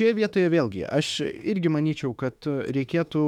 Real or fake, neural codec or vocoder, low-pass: fake; autoencoder, 48 kHz, 32 numbers a frame, DAC-VAE, trained on Japanese speech; 19.8 kHz